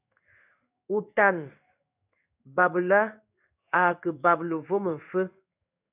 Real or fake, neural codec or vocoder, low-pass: fake; codec, 16 kHz in and 24 kHz out, 1 kbps, XY-Tokenizer; 3.6 kHz